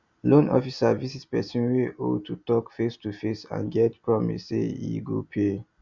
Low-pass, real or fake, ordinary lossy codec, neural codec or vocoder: 7.2 kHz; real; Opus, 64 kbps; none